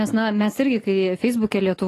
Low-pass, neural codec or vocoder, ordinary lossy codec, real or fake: 14.4 kHz; none; AAC, 48 kbps; real